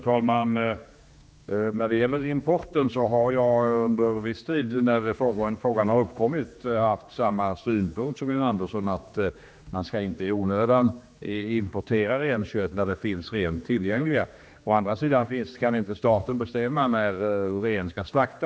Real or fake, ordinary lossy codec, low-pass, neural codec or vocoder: fake; none; none; codec, 16 kHz, 2 kbps, X-Codec, HuBERT features, trained on general audio